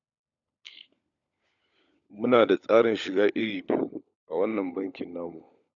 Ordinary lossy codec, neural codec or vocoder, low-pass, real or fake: none; codec, 16 kHz, 16 kbps, FunCodec, trained on LibriTTS, 50 frames a second; 7.2 kHz; fake